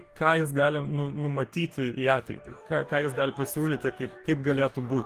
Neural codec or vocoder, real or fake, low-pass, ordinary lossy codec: codec, 44.1 kHz, 2.6 kbps, DAC; fake; 14.4 kHz; Opus, 24 kbps